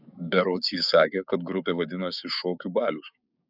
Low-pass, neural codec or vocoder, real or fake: 5.4 kHz; codec, 16 kHz, 6 kbps, DAC; fake